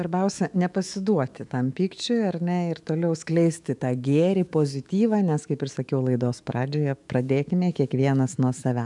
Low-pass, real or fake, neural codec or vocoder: 10.8 kHz; fake; autoencoder, 48 kHz, 128 numbers a frame, DAC-VAE, trained on Japanese speech